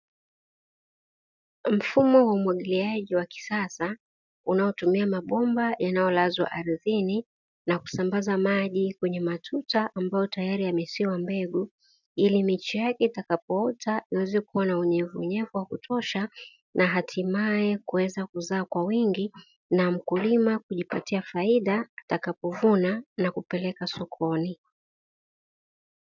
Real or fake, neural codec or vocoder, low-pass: real; none; 7.2 kHz